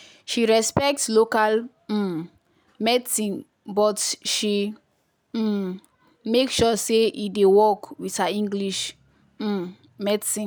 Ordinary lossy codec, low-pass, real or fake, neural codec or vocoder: none; none; real; none